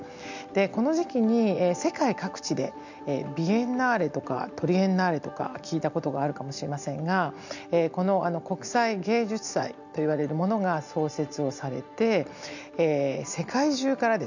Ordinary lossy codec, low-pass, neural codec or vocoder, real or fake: none; 7.2 kHz; none; real